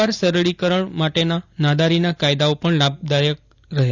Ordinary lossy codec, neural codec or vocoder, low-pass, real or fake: none; none; 7.2 kHz; real